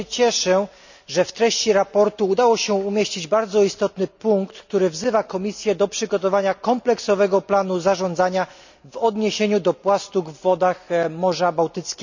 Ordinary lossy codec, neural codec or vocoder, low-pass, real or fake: none; none; 7.2 kHz; real